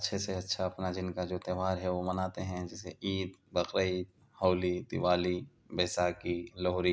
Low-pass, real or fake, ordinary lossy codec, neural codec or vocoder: none; real; none; none